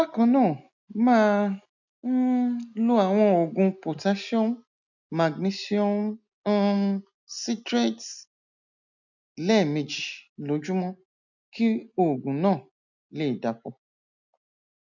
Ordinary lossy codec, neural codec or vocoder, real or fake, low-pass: none; none; real; 7.2 kHz